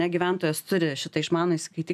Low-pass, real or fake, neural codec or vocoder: 14.4 kHz; real; none